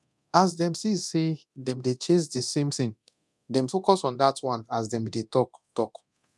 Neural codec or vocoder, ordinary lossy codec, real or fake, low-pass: codec, 24 kHz, 0.9 kbps, DualCodec; none; fake; none